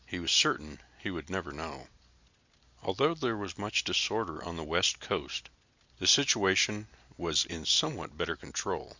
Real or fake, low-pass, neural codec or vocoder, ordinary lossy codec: real; 7.2 kHz; none; Opus, 64 kbps